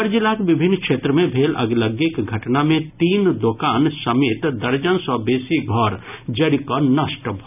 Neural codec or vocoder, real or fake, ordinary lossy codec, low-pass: none; real; none; 3.6 kHz